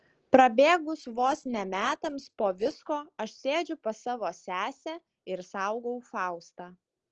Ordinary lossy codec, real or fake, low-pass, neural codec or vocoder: Opus, 16 kbps; real; 7.2 kHz; none